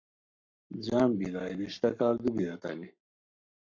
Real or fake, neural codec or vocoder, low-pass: fake; codec, 44.1 kHz, 7.8 kbps, Pupu-Codec; 7.2 kHz